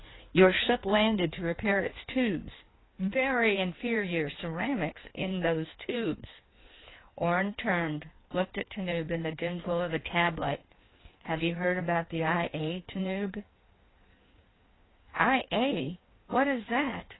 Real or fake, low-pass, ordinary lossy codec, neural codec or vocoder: fake; 7.2 kHz; AAC, 16 kbps; codec, 16 kHz in and 24 kHz out, 1.1 kbps, FireRedTTS-2 codec